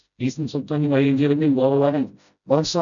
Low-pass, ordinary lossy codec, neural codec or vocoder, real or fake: 7.2 kHz; none; codec, 16 kHz, 0.5 kbps, FreqCodec, smaller model; fake